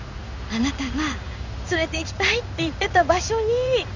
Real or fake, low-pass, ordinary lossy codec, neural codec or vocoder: fake; 7.2 kHz; Opus, 64 kbps; codec, 16 kHz in and 24 kHz out, 1 kbps, XY-Tokenizer